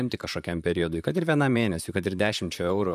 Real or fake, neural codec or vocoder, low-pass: fake; vocoder, 44.1 kHz, 128 mel bands, Pupu-Vocoder; 14.4 kHz